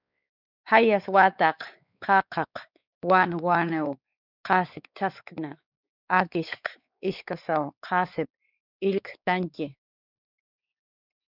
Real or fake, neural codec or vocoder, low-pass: fake; codec, 16 kHz, 4 kbps, X-Codec, WavLM features, trained on Multilingual LibriSpeech; 5.4 kHz